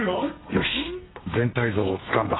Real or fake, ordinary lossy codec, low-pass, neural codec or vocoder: fake; AAC, 16 kbps; 7.2 kHz; codec, 32 kHz, 1.9 kbps, SNAC